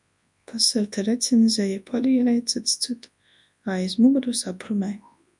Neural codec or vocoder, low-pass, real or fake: codec, 24 kHz, 0.9 kbps, WavTokenizer, large speech release; 10.8 kHz; fake